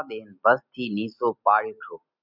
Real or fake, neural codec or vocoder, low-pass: real; none; 5.4 kHz